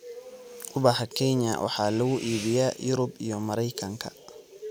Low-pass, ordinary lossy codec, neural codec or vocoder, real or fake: none; none; none; real